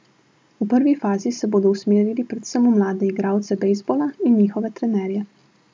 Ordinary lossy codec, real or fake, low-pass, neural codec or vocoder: none; real; none; none